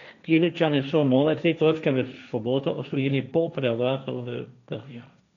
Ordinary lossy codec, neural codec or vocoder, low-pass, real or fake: none; codec, 16 kHz, 1.1 kbps, Voila-Tokenizer; 7.2 kHz; fake